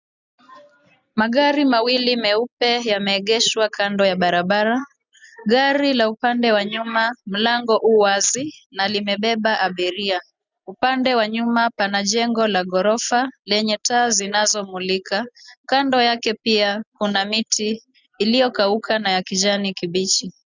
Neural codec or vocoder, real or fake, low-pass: none; real; 7.2 kHz